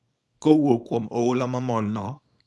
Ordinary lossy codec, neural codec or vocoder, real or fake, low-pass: none; codec, 24 kHz, 0.9 kbps, WavTokenizer, small release; fake; none